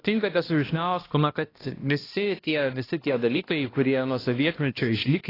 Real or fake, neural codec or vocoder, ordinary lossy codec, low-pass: fake; codec, 16 kHz, 1 kbps, X-Codec, HuBERT features, trained on balanced general audio; AAC, 24 kbps; 5.4 kHz